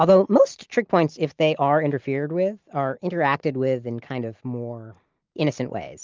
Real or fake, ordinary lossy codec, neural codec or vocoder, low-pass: real; Opus, 24 kbps; none; 7.2 kHz